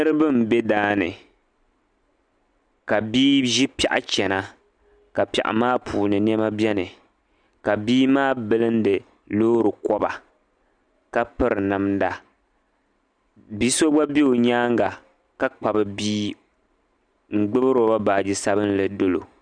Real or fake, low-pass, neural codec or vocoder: real; 9.9 kHz; none